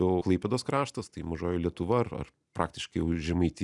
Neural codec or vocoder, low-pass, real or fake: none; 10.8 kHz; real